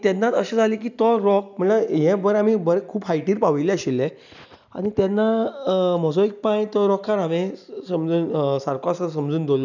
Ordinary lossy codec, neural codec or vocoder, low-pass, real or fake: none; none; 7.2 kHz; real